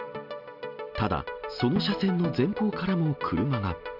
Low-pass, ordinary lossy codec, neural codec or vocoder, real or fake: 5.4 kHz; none; none; real